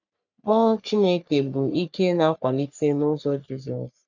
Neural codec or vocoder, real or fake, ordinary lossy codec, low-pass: codec, 44.1 kHz, 3.4 kbps, Pupu-Codec; fake; none; 7.2 kHz